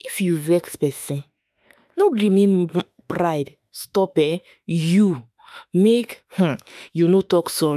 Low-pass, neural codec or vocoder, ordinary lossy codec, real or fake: 14.4 kHz; autoencoder, 48 kHz, 32 numbers a frame, DAC-VAE, trained on Japanese speech; none; fake